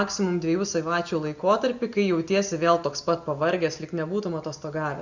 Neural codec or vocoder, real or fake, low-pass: none; real; 7.2 kHz